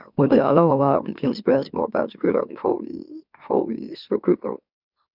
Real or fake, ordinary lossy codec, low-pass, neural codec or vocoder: fake; none; 5.4 kHz; autoencoder, 44.1 kHz, a latent of 192 numbers a frame, MeloTTS